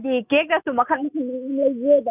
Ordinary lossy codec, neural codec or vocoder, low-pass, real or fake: none; none; 3.6 kHz; real